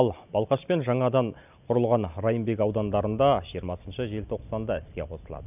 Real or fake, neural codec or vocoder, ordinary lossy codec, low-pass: real; none; none; 3.6 kHz